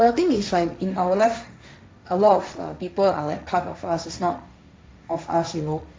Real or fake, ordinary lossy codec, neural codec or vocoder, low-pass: fake; none; codec, 16 kHz, 1.1 kbps, Voila-Tokenizer; none